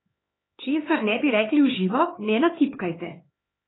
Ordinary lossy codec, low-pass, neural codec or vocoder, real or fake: AAC, 16 kbps; 7.2 kHz; codec, 16 kHz, 4 kbps, X-Codec, HuBERT features, trained on LibriSpeech; fake